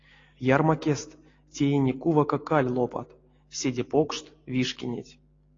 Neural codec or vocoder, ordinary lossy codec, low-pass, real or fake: none; AAC, 32 kbps; 7.2 kHz; real